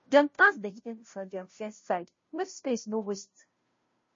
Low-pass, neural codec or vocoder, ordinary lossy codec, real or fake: 7.2 kHz; codec, 16 kHz, 0.5 kbps, FunCodec, trained on Chinese and English, 25 frames a second; MP3, 32 kbps; fake